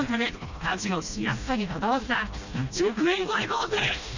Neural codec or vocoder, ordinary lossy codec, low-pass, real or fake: codec, 16 kHz, 1 kbps, FreqCodec, smaller model; Opus, 64 kbps; 7.2 kHz; fake